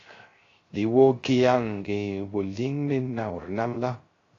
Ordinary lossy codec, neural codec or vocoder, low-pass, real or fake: AAC, 32 kbps; codec, 16 kHz, 0.3 kbps, FocalCodec; 7.2 kHz; fake